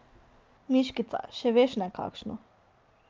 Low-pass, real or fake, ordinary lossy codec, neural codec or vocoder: 7.2 kHz; real; Opus, 24 kbps; none